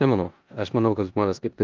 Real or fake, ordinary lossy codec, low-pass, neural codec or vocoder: fake; Opus, 24 kbps; 7.2 kHz; codec, 16 kHz in and 24 kHz out, 0.9 kbps, LongCat-Audio-Codec, four codebook decoder